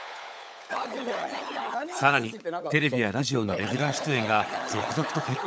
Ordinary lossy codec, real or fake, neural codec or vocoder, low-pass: none; fake; codec, 16 kHz, 16 kbps, FunCodec, trained on LibriTTS, 50 frames a second; none